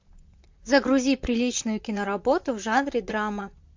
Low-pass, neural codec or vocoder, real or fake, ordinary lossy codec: 7.2 kHz; vocoder, 44.1 kHz, 128 mel bands, Pupu-Vocoder; fake; MP3, 48 kbps